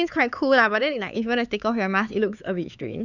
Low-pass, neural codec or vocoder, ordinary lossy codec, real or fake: 7.2 kHz; codec, 16 kHz, 4 kbps, X-Codec, HuBERT features, trained on LibriSpeech; none; fake